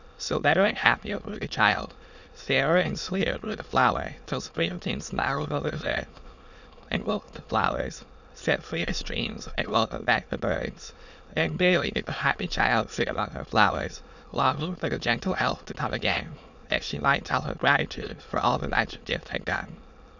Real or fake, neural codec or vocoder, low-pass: fake; autoencoder, 22.05 kHz, a latent of 192 numbers a frame, VITS, trained on many speakers; 7.2 kHz